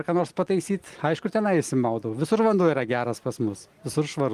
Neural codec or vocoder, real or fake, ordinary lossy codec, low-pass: none; real; Opus, 32 kbps; 14.4 kHz